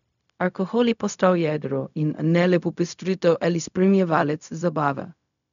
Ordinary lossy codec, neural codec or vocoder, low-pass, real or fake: none; codec, 16 kHz, 0.4 kbps, LongCat-Audio-Codec; 7.2 kHz; fake